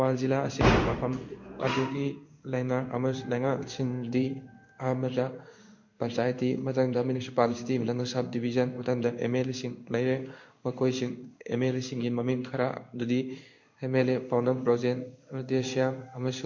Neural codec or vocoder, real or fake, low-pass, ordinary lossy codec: codec, 16 kHz in and 24 kHz out, 1 kbps, XY-Tokenizer; fake; 7.2 kHz; MP3, 48 kbps